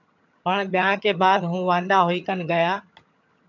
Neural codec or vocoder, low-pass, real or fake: vocoder, 22.05 kHz, 80 mel bands, HiFi-GAN; 7.2 kHz; fake